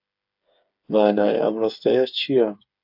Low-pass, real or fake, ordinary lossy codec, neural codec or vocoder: 5.4 kHz; fake; AAC, 48 kbps; codec, 16 kHz, 8 kbps, FreqCodec, smaller model